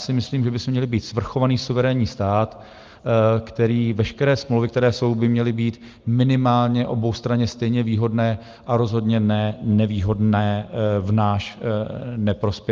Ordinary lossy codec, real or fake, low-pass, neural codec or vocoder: Opus, 24 kbps; real; 7.2 kHz; none